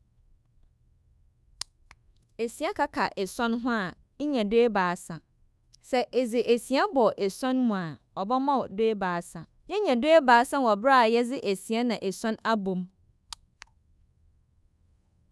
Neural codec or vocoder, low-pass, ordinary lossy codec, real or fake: codec, 24 kHz, 1.2 kbps, DualCodec; none; none; fake